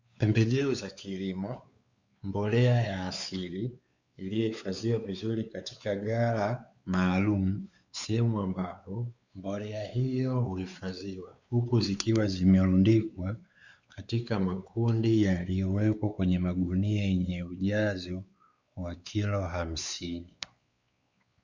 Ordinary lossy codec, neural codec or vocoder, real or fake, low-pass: Opus, 64 kbps; codec, 16 kHz, 4 kbps, X-Codec, WavLM features, trained on Multilingual LibriSpeech; fake; 7.2 kHz